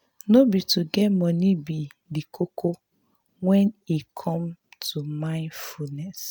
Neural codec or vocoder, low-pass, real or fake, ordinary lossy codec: none; none; real; none